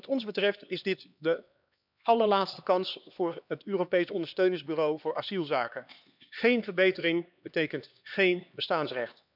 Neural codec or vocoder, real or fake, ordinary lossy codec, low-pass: codec, 16 kHz, 2 kbps, X-Codec, HuBERT features, trained on LibriSpeech; fake; none; 5.4 kHz